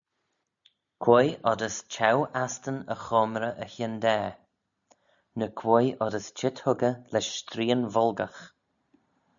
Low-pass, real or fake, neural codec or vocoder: 7.2 kHz; real; none